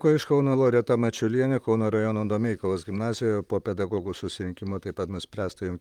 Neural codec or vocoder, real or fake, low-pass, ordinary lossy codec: vocoder, 44.1 kHz, 128 mel bands, Pupu-Vocoder; fake; 19.8 kHz; Opus, 32 kbps